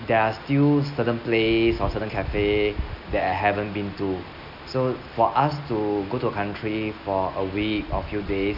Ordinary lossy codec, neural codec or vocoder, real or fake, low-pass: none; none; real; 5.4 kHz